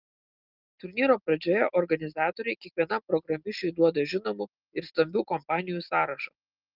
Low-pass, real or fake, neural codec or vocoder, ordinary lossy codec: 5.4 kHz; real; none; Opus, 32 kbps